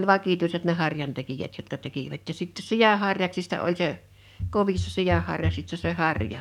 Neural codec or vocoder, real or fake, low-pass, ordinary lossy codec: codec, 44.1 kHz, 7.8 kbps, DAC; fake; 19.8 kHz; none